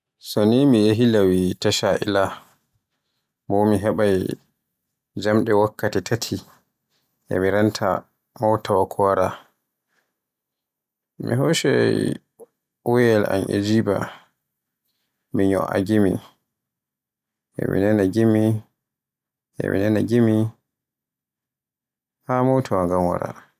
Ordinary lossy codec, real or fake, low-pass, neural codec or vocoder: none; real; 14.4 kHz; none